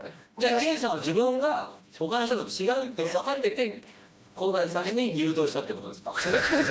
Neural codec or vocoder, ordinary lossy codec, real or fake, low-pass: codec, 16 kHz, 1 kbps, FreqCodec, smaller model; none; fake; none